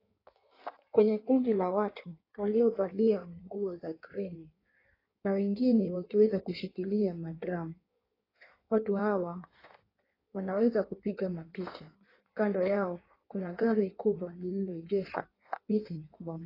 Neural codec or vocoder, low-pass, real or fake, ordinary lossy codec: codec, 16 kHz in and 24 kHz out, 1.1 kbps, FireRedTTS-2 codec; 5.4 kHz; fake; AAC, 24 kbps